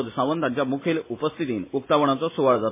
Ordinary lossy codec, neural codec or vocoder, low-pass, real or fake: MP3, 16 kbps; none; 3.6 kHz; real